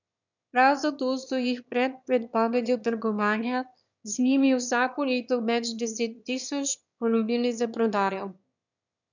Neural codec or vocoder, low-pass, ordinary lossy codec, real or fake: autoencoder, 22.05 kHz, a latent of 192 numbers a frame, VITS, trained on one speaker; 7.2 kHz; none; fake